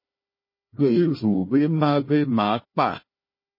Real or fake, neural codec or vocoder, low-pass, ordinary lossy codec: fake; codec, 16 kHz, 1 kbps, FunCodec, trained on Chinese and English, 50 frames a second; 5.4 kHz; MP3, 24 kbps